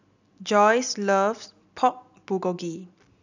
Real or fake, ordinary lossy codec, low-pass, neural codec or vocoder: real; none; 7.2 kHz; none